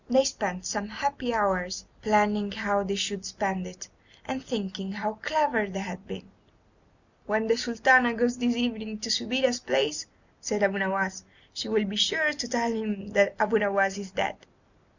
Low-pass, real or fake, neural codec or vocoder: 7.2 kHz; real; none